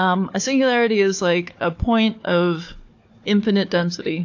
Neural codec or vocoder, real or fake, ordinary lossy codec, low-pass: codec, 16 kHz, 4 kbps, FunCodec, trained on Chinese and English, 50 frames a second; fake; AAC, 48 kbps; 7.2 kHz